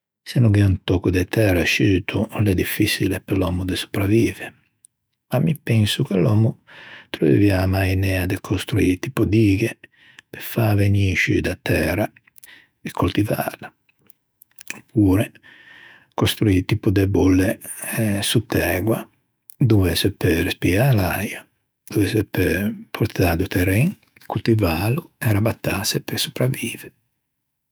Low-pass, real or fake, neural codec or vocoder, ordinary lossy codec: none; fake; autoencoder, 48 kHz, 128 numbers a frame, DAC-VAE, trained on Japanese speech; none